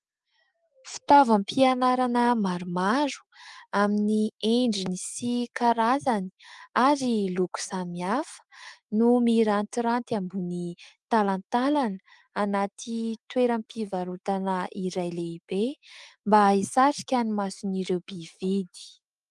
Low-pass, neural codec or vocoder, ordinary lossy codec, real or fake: 10.8 kHz; autoencoder, 48 kHz, 128 numbers a frame, DAC-VAE, trained on Japanese speech; Opus, 24 kbps; fake